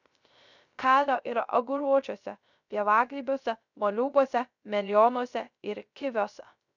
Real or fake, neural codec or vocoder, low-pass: fake; codec, 16 kHz, 0.3 kbps, FocalCodec; 7.2 kHz